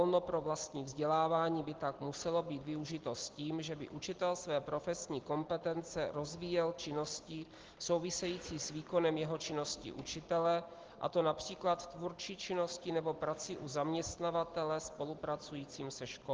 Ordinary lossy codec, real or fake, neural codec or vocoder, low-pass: Opus, 16 kbps; real; none; 7.2 kHz